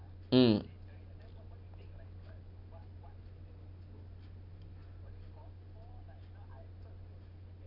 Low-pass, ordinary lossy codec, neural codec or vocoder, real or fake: 5.4 kHz; none; none; real